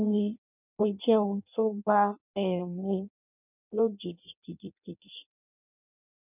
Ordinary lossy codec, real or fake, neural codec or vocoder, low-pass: none; fake; codec, 16 kHz in and 24 kHz out, 0.6 kbps, FireRedTTS-2 codec; 3.6 kHz